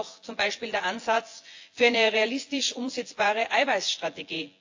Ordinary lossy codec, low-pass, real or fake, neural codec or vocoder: AAC, 48 kbps; 7.2 kHz; fake; vocoder, 24 kHz, 100 mel bands, Vocos